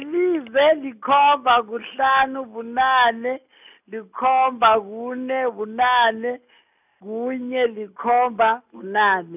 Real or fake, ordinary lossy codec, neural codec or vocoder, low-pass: real; none; none; 3.6 kHz